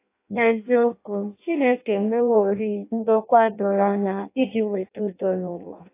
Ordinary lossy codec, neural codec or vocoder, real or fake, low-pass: AAC, 24 kbps; codec, 16 kHz in and 24 kHz out, 0.6 kbps, FireRedTTS-2 codec; fake; 3.6 kHz